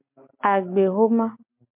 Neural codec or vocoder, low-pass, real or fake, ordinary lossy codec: none; 3.6 kHz; real; MP3, 32 kbps